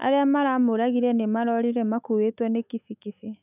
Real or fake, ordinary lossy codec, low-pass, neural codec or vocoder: fake; none; 3.6 kHz; autoencoder, 48 kHz, 128 numbers a frame, DAC-VAE, trained on Japanese speech